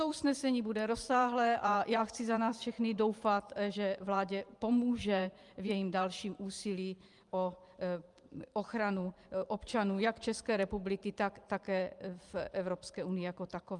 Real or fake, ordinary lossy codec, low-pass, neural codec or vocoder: fake; Opus, 32 kbps; 10.8 kHz; vocoder, 44.1 kHz, 128 mel bands every 512 samples, BigVGAN v2